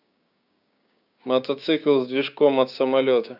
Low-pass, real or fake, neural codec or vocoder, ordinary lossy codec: 5.4 kHz; fake; codec, 16 kHz, 6 kbps, DAC; none